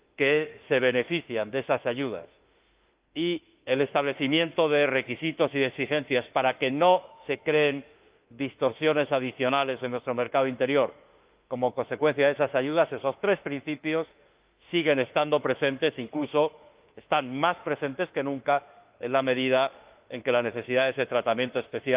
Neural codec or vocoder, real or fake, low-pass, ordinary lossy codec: autoencoder, 48 kHz, 32 numbers a frame, DAC-VAE, trained on Japanese speech; fake; 3.6 kHz; Opus, 32 kbps